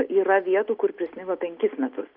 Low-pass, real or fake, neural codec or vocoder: 5.4 kHz; real; none